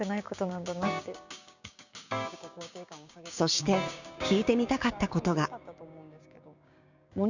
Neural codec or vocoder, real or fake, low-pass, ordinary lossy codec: codec, 16 kHz, 6 kbps, DAC; fake; 7.2 kHz; none